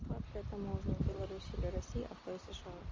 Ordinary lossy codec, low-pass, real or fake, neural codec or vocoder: Opus, 32 kbps; 7.2 kHz; real; none